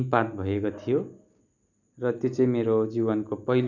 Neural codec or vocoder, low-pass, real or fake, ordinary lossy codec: none; 7.2 kHz; real; none